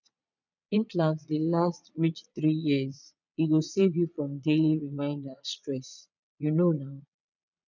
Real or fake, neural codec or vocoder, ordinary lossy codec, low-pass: fake; vocoder, 22.05 kHz, 80 mel bands, Vocos; none; 7.2 kHz